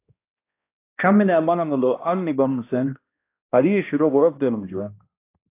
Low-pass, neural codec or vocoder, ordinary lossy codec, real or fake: 3.6 kHz; codec, 16 kHz, 1 kbps, X-Codec, HuBERT features, trained on balanced general audio; AAC, 24 kbps; fake